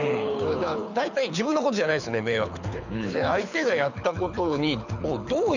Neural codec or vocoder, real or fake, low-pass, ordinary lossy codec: codec, 24 kHz, 6 kbps, HILCodec; fake; 7.2 kHz; none